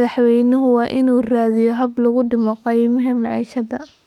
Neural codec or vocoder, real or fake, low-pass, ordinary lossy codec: autoencoder, 48 kHz, 32 numbers a frame, DAC-VAE, trained on Japanese speech; fake; 19.8 kHz; none